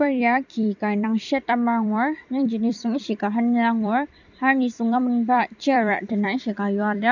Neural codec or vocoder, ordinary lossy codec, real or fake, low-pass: vocoder, 44.1 kHz, 128 mel bands every 256 samples, BigVGAN v2; none; fake; 7.2 kHz